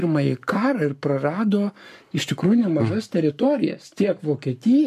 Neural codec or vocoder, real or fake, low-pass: codec, 44.1 kHz, 7.8 kbps, Pupu-Codec; fake; 14.4 kHz